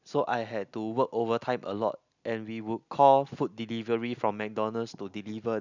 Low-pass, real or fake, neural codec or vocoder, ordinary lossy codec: 7.2 kHz; real; none; none